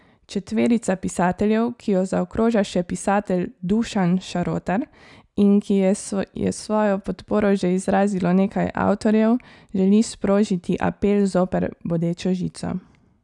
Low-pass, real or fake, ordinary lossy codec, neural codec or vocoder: 10.8 kHz; real; none; none